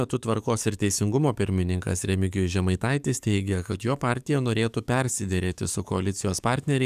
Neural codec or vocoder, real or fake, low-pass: codec, 44.1 kHz, 7.8 kbps, DAC; fake; 14.4 kHz